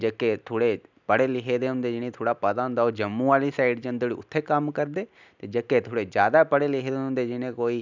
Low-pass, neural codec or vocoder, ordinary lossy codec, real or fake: 7.2 kHz; none; none; real